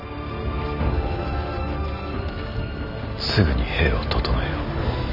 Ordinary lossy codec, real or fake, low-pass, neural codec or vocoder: none; real; 5.4 kHz; none